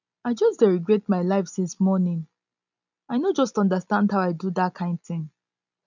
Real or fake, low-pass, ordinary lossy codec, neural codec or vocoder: real; 7.2 kHz; none; none